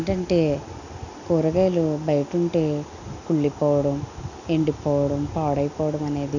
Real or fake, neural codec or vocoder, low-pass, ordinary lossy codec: real; none; 7.2 kHz; none